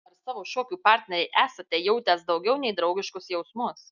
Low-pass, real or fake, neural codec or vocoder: 7.2 kHz; real; none